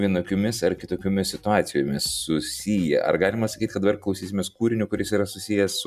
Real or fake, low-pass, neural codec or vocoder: real; 14.4 kHz; none